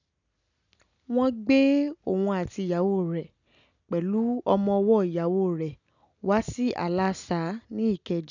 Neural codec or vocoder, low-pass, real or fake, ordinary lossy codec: none; 7.2 kHz; real; none